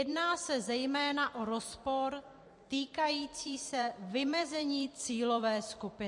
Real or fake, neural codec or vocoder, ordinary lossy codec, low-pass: fake; vocoder, 44.1 kHz, 128 mel bands every 256 samples, BigVGAN v2; MP3, 48 kbps; 10.8 kHz